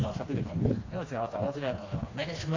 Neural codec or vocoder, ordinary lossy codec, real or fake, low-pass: codec, 16 kHz, 2 kbps, FreqCodec, smaller model; AAC, 32 kbps; fake; 7.2 kHz